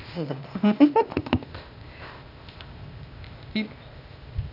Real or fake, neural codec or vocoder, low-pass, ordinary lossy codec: fake; codec, 16 kHz, 0.8 kbps, ZipCodec; 5.4 kHz; none